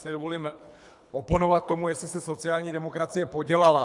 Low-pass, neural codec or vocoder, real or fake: 10.8 kHz; codec, 24 kHz, 3 kbps, HILCodec; fake